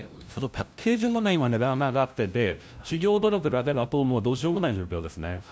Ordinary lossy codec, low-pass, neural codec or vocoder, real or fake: none; none; codec, 16 kHz, 0.5 kbps, FunCodec, trained on LibriTTS, 25 frames a second; fake